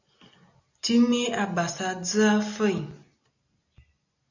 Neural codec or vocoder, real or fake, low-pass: none; real; 7.2 kHz